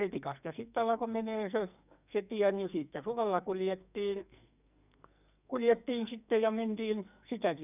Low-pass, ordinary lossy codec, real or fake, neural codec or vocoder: 3.6 kHz; none; fake; codec, 44.1 kHz, 2.6 kbps, SNAC